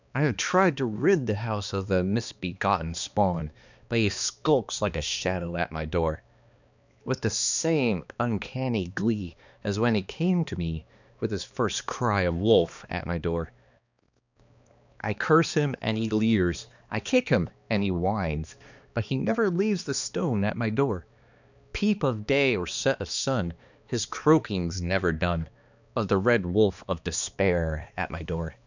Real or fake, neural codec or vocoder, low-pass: fake; codec, 16 kHz, 2 kbps, X-Codec, HuBERT features, trained on balanced general audio; 7.2 kHz